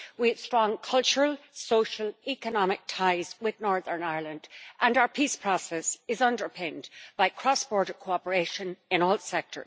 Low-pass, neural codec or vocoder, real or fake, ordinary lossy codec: none; none; real; none